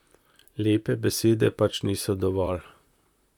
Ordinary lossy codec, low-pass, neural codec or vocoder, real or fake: none; 19.8 kHz; vocoder, 44.1 kHz, 128 mel bands, Pupu-Vocoder; fake